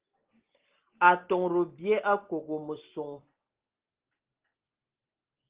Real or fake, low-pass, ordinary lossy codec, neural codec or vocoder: real; 3.6 kHz; Opus, 16 kbps; none